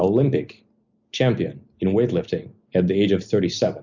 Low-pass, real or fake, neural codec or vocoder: 7.2 kHz; real; none